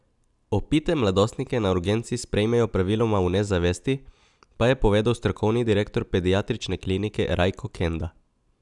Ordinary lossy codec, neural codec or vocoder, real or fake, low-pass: none; none; real; 10.8 kHz